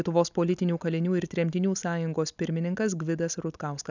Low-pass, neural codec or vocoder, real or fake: 7.2 kHz; none; real